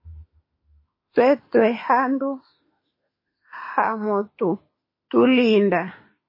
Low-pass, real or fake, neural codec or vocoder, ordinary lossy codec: 5.4 kHz; fake; autoencoder, 48 kHz, 32 numbers a frame, DAC-VAE, trained on Japanese speech; MP3, 24 kbps